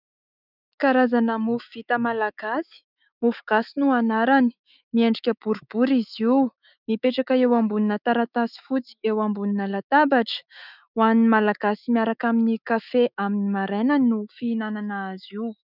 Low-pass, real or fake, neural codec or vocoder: 5.4 kHz; fake; autoencoder, 48 kHz, 128 numbers a frame, DAC-VAE, trained on Japanese speech